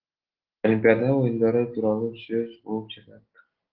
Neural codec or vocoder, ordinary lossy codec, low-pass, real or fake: none; Opus, 16 kbps; 5.4 kHz; real